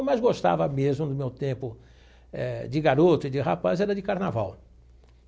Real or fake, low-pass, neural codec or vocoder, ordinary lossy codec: real; none; none; none